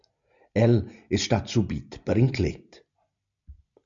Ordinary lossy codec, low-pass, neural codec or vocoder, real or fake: MP3, 64 kbps; 7.2 kHz; none; real